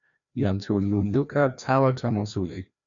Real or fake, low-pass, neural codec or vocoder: fake; 7.2 kHz; codec, 16 kHz, 1 kbps, FreqCodec, larger model